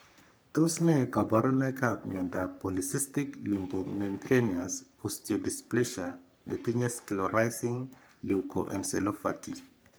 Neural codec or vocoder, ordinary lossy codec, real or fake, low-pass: codec, 44.1 kHz, 3.4 kbps, Pupu-Codec; none; fake; none